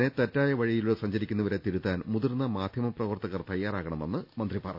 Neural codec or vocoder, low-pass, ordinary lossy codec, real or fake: none; 5.4 kHz; none; real